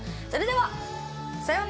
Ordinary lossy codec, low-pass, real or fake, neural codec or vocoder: none; none; real; none